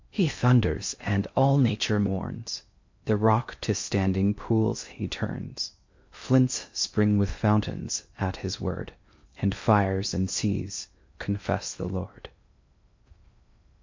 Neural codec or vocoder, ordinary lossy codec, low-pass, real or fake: codec, 16 kHz in and 24 kHz out, 0.6 kbps, FocalCodec, streaming, 4096 codes; MP3, 48 kbps; 7.2 kHz; fake